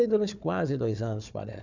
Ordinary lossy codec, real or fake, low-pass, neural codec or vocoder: none; fake; 7.2 kHz; codec, 16 kHz, 4 kbps, FunCodec, trained on Chinese and English, 50 frames a second